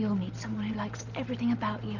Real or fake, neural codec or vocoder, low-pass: real; none; 7.2 kHz